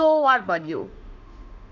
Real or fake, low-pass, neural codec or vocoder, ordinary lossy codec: fake; 7.2 kHz; autoencoder, 48 kHz, 32 numbers a frame, DAC-VAE, trained on Japanese speech; none